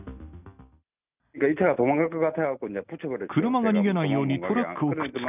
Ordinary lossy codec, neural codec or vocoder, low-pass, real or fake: none; none; 3.6 kHz; real